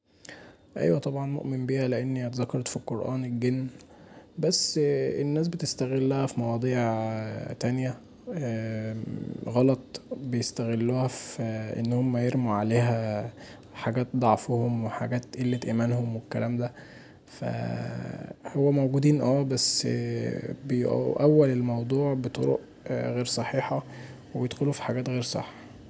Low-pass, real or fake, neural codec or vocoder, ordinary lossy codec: none; real; none; none